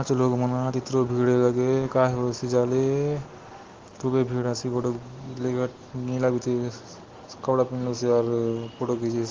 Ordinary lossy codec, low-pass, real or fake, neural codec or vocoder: Opus, 16 kbps; 7.2 kHz; real; none